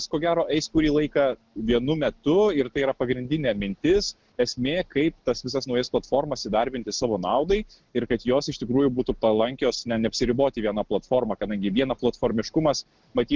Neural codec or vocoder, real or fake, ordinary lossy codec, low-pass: none; real; Opus, 16 kbps; 7.2 kHz